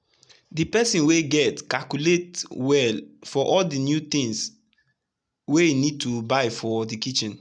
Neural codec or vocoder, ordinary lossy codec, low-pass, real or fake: none; none; 9.9 kHz; real